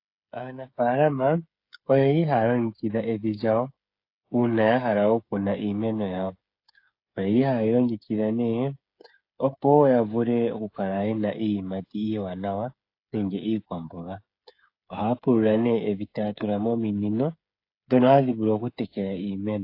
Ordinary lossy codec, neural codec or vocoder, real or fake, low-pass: AAC, 32 kbps; codec, 16 kHz, 8 kbps, FreqCodec, smaller model; fake; 5.4 kHz